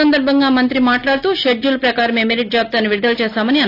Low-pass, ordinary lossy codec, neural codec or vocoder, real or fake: 5.4 kHz; AAC, 32 kbps; none; real